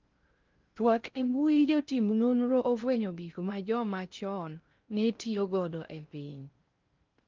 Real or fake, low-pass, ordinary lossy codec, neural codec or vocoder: fake; 7.2 kHz; Opus, 24 kbps; codec, 16 kHz in and 24 kHz out, 0.6 kbps, FocalCodec, streaming, 4096 codes